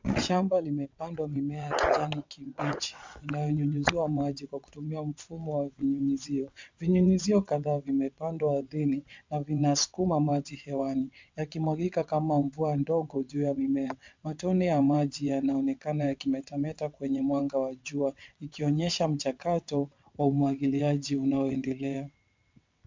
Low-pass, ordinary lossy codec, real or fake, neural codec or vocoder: 7.2 kHz; MP3, 64 kbps; fake; vocoder, 22.05 kHz, 80 mel bands, WaveNeXt